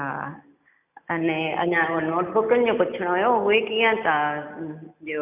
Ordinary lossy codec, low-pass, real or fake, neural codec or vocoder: none; 3.6 kHz; real; none